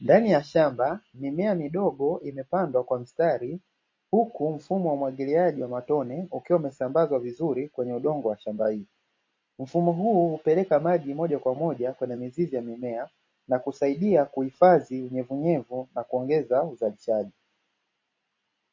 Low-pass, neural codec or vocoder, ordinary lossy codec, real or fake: 7.2 kHz; none; MP3, 32 kbps; real